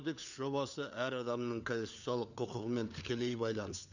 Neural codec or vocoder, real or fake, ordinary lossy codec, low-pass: codec, 16 kHz, 4 kbps, FunCodec, trained on LibriTTS, 50 frames a second; fake; none; 7.2 kHz